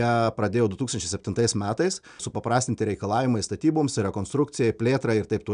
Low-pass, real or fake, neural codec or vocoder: 9.9 kHz; real; none